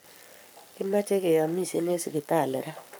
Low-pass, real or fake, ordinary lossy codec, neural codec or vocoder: none; fake; none; codec, 44.1 kHz, 7.8 kbps, Pupu-Codec